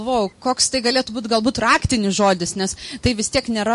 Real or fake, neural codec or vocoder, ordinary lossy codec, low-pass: real; none; MP3, 48 kbps; 14.4 kHz